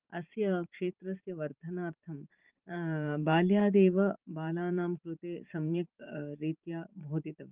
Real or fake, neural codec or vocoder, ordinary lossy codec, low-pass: fake; vocoder, 44.1 kHz, 128 mel bands every 512 samples, BigVGAN v2; Opus, 32 kbps; 3.6 kHz